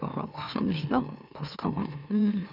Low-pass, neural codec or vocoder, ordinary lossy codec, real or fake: 5.4 kHz; autoencoder, 44.1 kHz, a latent of 192 numbers a frame, MeloTTS; none; fake